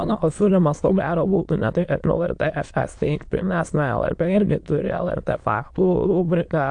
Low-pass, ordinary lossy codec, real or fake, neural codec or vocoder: 9.9 kHz; AAC, 64 kbps; fake; autoencoder, 22.05 kHz, a latent of 192 numbers a frame, VITS, trained on many speakers